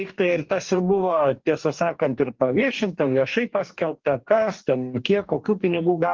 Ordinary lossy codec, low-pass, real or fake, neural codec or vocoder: Opus, 32 kbps; 7.2 kHz; fake; codec, 44.1 kHz, 2.6 kbps, DAC